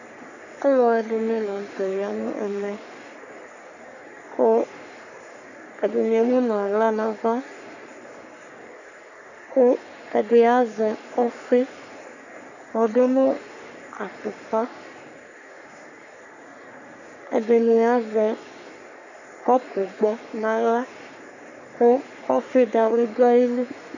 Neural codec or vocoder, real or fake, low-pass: codec, 44.1 kHz, 3.4 kbps, Pupu-Codec; fake; 7.2 kHz